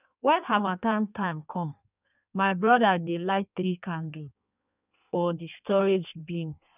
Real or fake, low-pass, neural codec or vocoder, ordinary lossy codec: fake; 3.6 kHz; codec, 16 kHz in and 24 kHz out, 1.1 kbps, FireRedTTS-2 codec; none